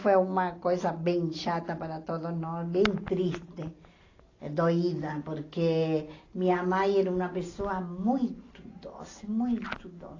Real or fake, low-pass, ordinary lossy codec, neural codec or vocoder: real; 7.2 kHz; AAC, 32 kbps; none